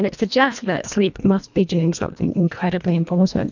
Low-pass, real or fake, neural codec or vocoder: 7.2 kHz; fake; codec, 24 kHz, 1.5 kbps, HILCodec